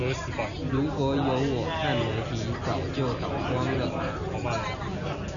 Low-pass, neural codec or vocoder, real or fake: 7.2 kHz; none; real